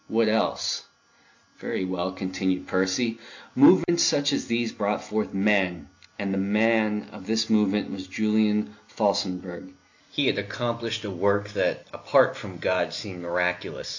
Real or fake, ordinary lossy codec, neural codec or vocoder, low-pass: real; AAC, 48 kbps; none; 7.2 kHz